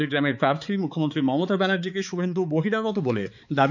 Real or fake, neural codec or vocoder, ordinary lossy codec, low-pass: fake; codec, 16 kHz, 4 kbps, X-Codec, HuBERT features, trained on balanced general audio; none; 7.2 kHz